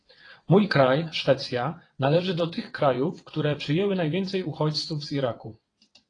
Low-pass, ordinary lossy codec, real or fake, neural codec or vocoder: 9.9 kHz; AAC, 32 kbps; fake; vocoder, 22.05 kHz, 80 mel bands, WaveNeXt